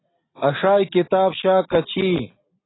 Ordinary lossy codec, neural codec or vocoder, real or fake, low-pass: AAC, 16 kbps; none; real; 7.2 kHz